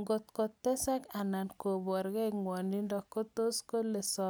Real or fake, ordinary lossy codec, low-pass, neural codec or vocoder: real; none; none; none